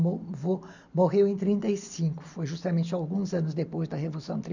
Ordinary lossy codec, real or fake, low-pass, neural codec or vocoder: none; real; 7.2 kHz; none